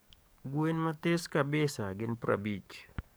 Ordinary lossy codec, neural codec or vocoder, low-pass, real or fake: none; codec, 44.1 kHz, 7.8 kbps, DAC; none; fake